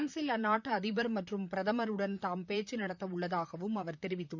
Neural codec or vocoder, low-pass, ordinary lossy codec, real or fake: vocoder, 44.1 kHz, 128 mel bands, Pupu-Vocoder; 7.2 kHz; none; fake